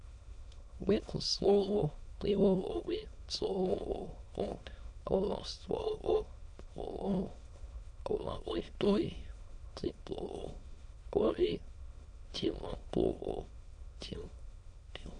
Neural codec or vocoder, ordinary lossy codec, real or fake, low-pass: autoencoder, 22.05 kHz, a latent of 192 numbers a frame, VITS, trained on many speakers; AAC, 48 kbps; fake; 9.9 kHz